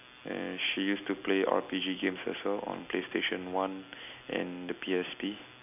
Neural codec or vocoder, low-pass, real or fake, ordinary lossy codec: none; 3.6 kHz; real; none